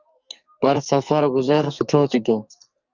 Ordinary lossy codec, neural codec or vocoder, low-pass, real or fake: Opus, 64 kbps; codec, 44.1 kHz, 2.6 kbps, SNAC; 7.2 kHz; fake